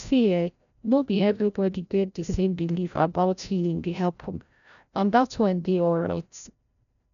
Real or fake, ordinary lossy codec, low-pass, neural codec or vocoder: fake; none; 7.2 kHz; codec, 16 kHz, 0.5 kbps, FreqCodec, larger model